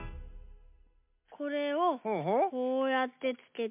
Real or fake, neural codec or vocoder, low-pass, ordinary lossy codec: real; none; 3.6 kHz; MP3, 32 kbps